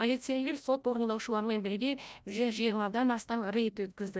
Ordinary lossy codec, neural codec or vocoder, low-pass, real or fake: none; codec, 16 kHz, 0.5 kbps, FreqCodec, larger model; none; fake